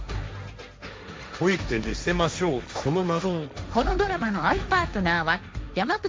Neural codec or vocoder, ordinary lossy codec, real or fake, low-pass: codec, 16 kHz, 1.1 kbps, Voila-Tokenizer; none; fake; none